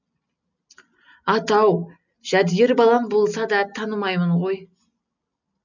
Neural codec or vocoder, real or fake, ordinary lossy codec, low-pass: none; real; none; 7.2 kHz